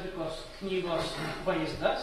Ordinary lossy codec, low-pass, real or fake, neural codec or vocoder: AAC, 32 kbps; 19.8 kHz; real; none